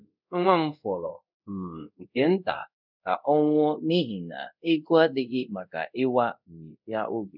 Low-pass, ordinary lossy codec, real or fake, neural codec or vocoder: 5.4 kHz; none; fake; codec, 24 kHz, 0.5 kbps, DualCodec